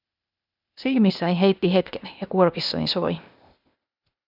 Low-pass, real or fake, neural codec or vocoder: 5.4 kHz; fake; codec, 16 kHz, 0.8 kbps, ZipCodec